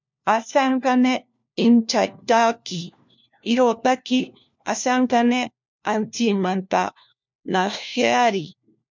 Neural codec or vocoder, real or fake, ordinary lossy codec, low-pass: codec, 16 kHz, 1 kbps, FunCodec, trained on LibriTTS, 50 frames a second; fake; MP3, 64 kbps; 7.2 kHz